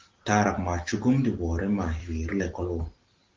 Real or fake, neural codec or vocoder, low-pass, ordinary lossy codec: real; none; 7.2 kHz; Opus, 16 kbps